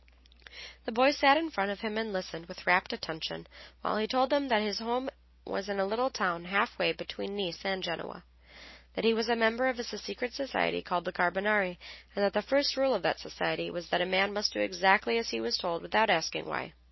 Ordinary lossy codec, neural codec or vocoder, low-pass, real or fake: MP3, 24 kbps; none; 7.2 kHz; real